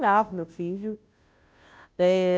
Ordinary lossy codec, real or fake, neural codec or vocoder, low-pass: none; fake; codec, 16 kHz, 0.5 kbps, FunCodec, trained on Chinese and English, 25 frames a second; none